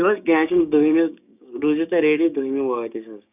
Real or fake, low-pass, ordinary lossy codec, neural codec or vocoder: fake; 3.6 kHz; none; codec, 16 kHz, 6 kbps, DAC